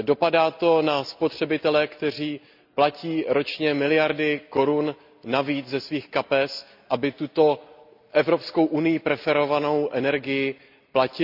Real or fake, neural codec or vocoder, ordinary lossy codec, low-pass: real; none; none; 5.4 kHz